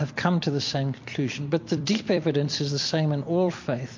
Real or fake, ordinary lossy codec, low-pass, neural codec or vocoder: fake; MP3, 48 kbps; 7.2 kHz; vocoder, 44.1 kHz, 128 mel bands every 256 samples, BigVGAN v2